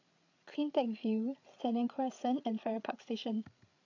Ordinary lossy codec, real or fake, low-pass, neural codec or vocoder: AAC, 48 kbps; fake; 7.2 kHz; codec, 16 kHz, 8 kbps, FreqCodec, larger model